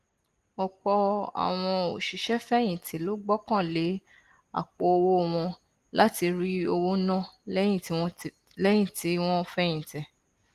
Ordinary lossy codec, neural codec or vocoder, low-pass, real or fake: Opus, 24 kbps; none; 14.4 kHz; real